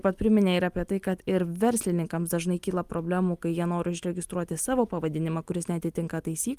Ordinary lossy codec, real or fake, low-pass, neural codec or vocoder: Opus, 32 kbps; real; 14.4 kHz; none